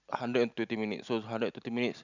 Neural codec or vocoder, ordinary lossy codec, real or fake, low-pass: none; none; real; 7.2 kHz